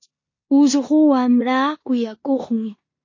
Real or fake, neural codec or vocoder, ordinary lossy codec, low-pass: fake; codec, 16 kHz in and 24 kHz out, 0.9 kbps, LongCat-Audio-Codec, four codebook decoder; MP3, 32 kbps; 7.2 kHz